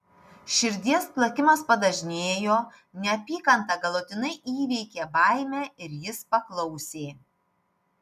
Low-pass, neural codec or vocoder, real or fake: 14.4 kHz; none; real